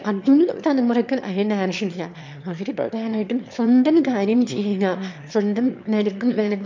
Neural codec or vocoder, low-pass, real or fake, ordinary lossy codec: autoencoder, 22.05 kHz, a latent of 192 numbers a frame, VITS, trained on one speaker; 7.2 kHz; fake; MP3, 64 kbps